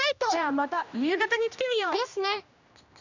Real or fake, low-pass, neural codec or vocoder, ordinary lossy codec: fake; 7.2 kHz; codec, 16 kHz, 1 kbps, X-Codec, HuBERT features, trained on general audio; none